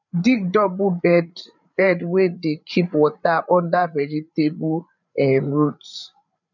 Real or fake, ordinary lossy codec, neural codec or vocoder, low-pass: fake; none; codec, 16 kHz, 8 kbps, FreqCodec, larger model; 7.2 kHz